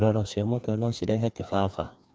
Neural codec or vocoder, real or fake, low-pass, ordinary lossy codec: codec, 16 kHz, 2 kbps, FreqCodec, larger model; fake; none; none